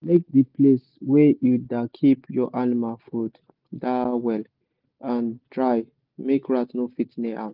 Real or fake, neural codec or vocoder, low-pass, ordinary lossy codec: real; none; 5.4 kHz; Opus, 24 kbps